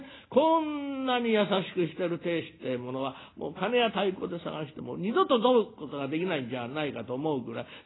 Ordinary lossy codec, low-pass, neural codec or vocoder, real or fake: AAC, 16 kbps; 7.2 kHz; none; real